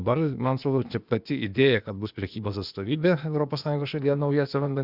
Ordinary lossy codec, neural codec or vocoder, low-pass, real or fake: Opus, 64 kbps; codec, 16 kHz, 0.8 kbps, ZipCodec; 5.4 kHz; fake